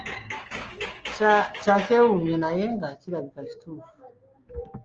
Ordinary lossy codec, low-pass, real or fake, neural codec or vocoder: Opus, 24 kbps; 7.2 kHz; fake; codec, 16 kHz, 6 kbps, DAC